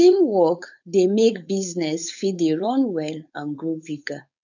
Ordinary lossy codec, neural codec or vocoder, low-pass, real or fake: none; codec, 16 kHz, 4.8 kbps, FACodec; 7.2 kHz; fake